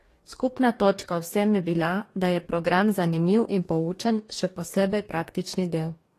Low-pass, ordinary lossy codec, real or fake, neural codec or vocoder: 14.4 kHz; AAC, 48 kbps; fake; codec, 44.1 kHz, 2.6 kbps, DAC